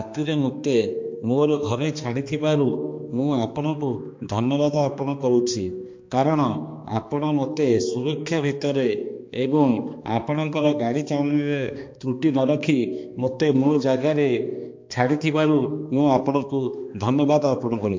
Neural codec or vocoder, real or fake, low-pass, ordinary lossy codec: codec, 16 kHz, 2 kbps, X-Codec, HuBERT features, trained on balanced general audio; fake; 7.2 kHz; MP3, 48 kbps